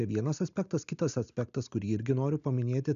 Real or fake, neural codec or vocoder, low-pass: real; none; 7.2 kHz